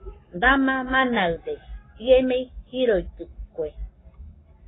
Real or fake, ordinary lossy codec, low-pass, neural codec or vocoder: fake; AAC, 16 kbps; 7.2 kHz; codec, 44.1 kHz, 7.8 kbps, Pupu-Codec